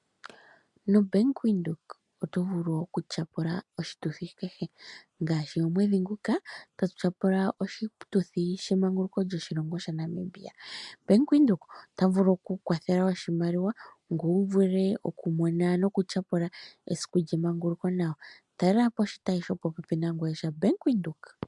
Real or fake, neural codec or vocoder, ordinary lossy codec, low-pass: real; none; MP3, 96 kbps; 10.8 kHz